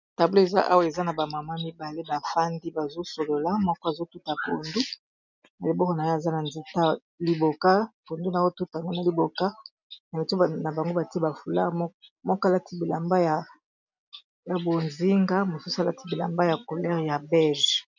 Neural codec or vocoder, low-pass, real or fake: none; 7.2 kHz; real